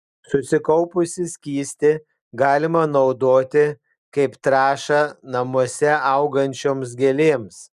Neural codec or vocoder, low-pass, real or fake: none; 14.4 kHz; real